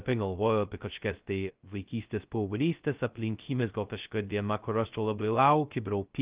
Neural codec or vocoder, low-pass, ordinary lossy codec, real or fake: codec, 16 kHz, 0.2 kbps, FocalCodec; 3.6 kHz; Opus, 32 kbps; fake